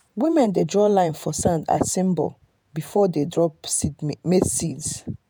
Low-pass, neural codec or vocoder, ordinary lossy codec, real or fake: none; vocoder, 48 kHz, 128 mel bands, Vocos; none; fake